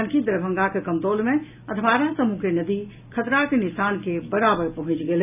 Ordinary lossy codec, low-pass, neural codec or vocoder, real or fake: none; 3.6 kHz; none; real